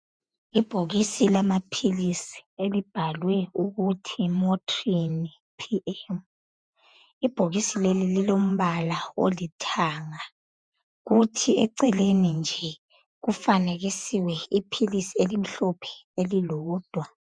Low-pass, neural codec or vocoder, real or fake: 9.9 kHz; vocoder, 48 kHz, 128 mel bands, Vocos; fake